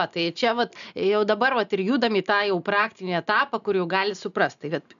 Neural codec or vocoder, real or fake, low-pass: none; real; 7.2 kHz